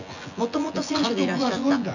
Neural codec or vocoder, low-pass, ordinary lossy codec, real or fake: vocoder, 24 kHz, 100 mel bands, Vocos; 7.2 kHz; none; fake